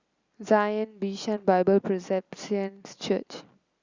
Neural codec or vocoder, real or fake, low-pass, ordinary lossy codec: none; real; 7.2 kHz; Opus, 64 kbps